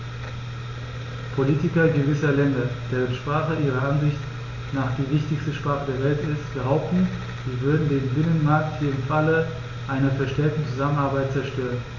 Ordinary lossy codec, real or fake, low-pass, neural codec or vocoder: Opus, 64 kbps; real; 7.2 kHz; none